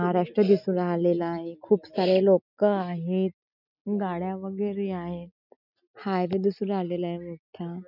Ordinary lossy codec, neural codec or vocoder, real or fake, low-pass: none; none; real; 5.4 kHz